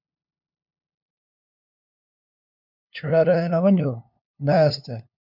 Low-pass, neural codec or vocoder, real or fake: 5.4 kHz; codec, 16 kHz, 2 kbps, FunCodec, trained on LibriTTS, 25 frames a second; fake